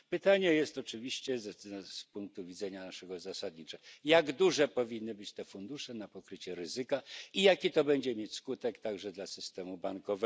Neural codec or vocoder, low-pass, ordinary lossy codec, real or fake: none; none; none; real